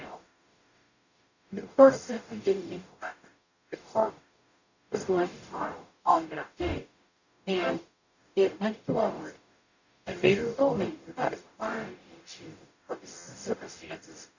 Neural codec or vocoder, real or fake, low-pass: codec, 44.1 kHz, 0.9 kbps, DAC; fake; 7.2 kHz